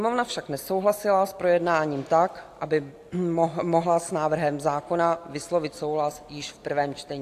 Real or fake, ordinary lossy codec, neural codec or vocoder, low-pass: real; AAC, 64 kbps; none; 14.4 kHz